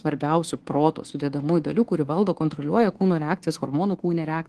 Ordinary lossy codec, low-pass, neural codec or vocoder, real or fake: Opus, 16 kbps; 10.8 kHz; codec, 24 kHz, 1.2 kbps, DualCodec; fake